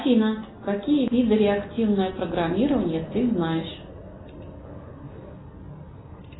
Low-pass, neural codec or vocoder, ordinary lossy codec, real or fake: 7.2 kHz; none; AAC, 16 kbps; real